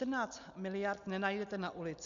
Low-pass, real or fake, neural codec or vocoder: 7.2 kHz; real; none